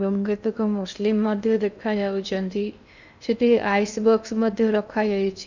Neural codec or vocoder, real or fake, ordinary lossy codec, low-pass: codec, 16 kHz in and 24 kHz out, 0.6 kbps, FocalCodec, streaming, 2048 codes; fake; none; 7.2 kHz